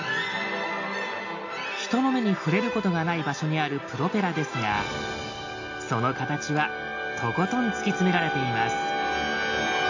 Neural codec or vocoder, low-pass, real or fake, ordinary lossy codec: none; 7.2 kHz; real; AAC, 48 kbps